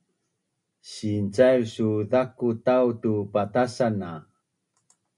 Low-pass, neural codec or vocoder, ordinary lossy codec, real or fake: 10.8 kHz; none; MP3, 96 kbps; real